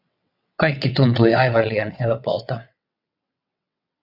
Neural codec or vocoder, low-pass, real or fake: vocoder, 22.05 kHz, 80 mel bands, WaveNeXt; 5.4 kHz; fake